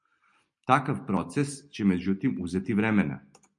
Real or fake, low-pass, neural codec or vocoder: real; 10.8 kHz; none